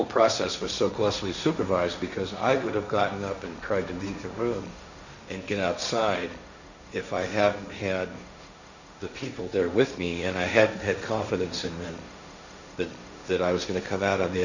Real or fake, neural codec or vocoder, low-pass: fake; codec, 16 kHz, 1.1 kbps, Voila-Tokenizer; 7.2 kHz